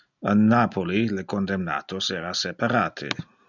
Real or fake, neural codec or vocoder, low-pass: real; none; 7.2 kHz